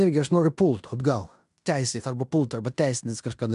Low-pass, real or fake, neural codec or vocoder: 10.8 kHz; fake; codec, 16 kHz in and 24 kHz out, 0.9 kbps, LongCat-Audio-Codec, fine tuned four codebook decoder